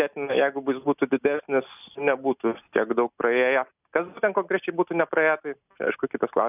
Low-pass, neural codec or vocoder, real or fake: 3.6 kHz; none; real